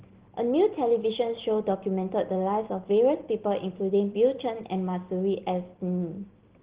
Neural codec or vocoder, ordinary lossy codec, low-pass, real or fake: none; Opus, 16 kbps; 3.6 kHz; real